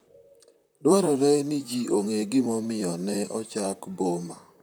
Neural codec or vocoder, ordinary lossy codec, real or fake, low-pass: vocoder, 44.1 kHz, 128 mel bands, Pupu-Vocoder; none; fake; none